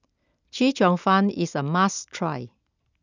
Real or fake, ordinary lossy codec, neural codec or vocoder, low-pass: real; none; none; 7.2 kHz